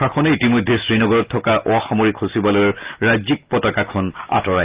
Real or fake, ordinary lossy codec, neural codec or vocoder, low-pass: real; Opus, 24 kbps; none; 3.6 kHz